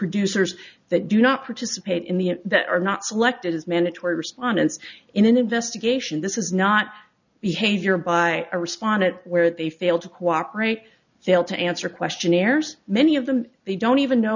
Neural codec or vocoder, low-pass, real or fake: none; 7.2 kHz; real